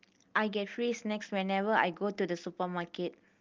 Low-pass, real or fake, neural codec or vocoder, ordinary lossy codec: 7.2 kHz; real; none; Opus, 32 kbps